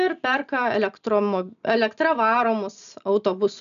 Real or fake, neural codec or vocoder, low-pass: real; none; 7.2 kHz